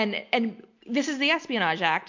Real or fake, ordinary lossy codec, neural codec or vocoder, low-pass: real; MP3, 48 kbps; none; 7.2 kHz